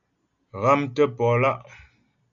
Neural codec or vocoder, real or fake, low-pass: none; real; 7.2 kHz